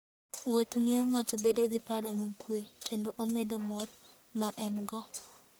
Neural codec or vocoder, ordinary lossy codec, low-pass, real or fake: codec, 44.1 kHz, 1.7 kbps, Pupu-Codec; none; none; fake